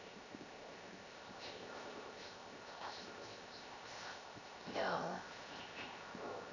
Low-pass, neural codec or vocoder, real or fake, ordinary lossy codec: 7.2 kHz; codec, 16 kHz, 0.7 kbps, FocalCodec; fake; none